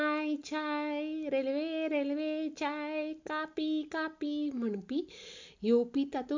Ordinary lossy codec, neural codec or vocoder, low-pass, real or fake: MP3, 48 kbps; none; 7.2 kHz; real